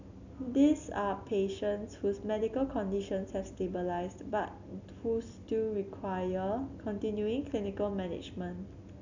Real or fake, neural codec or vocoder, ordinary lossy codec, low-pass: real; none; none; 7.2 kHz